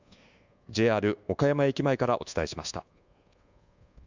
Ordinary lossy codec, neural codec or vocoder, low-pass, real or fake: Opus, 64 kbps; codec, 24 kHz, 1.2 kbps, DualCodec; 7.2 kHz; fake